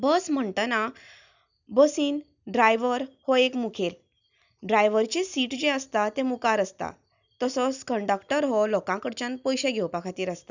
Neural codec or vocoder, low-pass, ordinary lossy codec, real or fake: none; 7.2 kHz; none; real